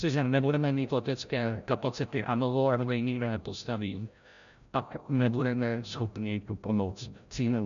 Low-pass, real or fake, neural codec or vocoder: 7.2 kHz; fake; codec, 16 kHz, 0.5 kbps, FreqCodec, larger model